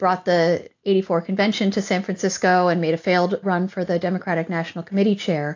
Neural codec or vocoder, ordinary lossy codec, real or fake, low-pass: none; AAC, 48 kbps; real; 7.2 kHz